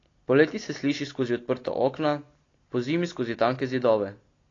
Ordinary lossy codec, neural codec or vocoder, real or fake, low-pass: AAC, 32 kbps; none; real; 7.2 kHz